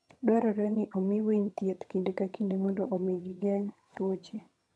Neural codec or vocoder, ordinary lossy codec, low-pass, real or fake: vocoder, 22.05 kHz, 80 mel bands, HiFi-GAN; none; none; fake